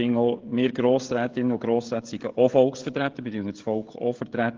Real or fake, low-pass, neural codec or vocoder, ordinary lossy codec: fake; 7.2 kHz; codec, 16 kHz, 16 kbps, FreqCodec, smaller model; Opus, 16 kbps